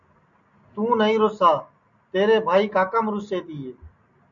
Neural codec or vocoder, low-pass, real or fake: none; 7.2 kHz; real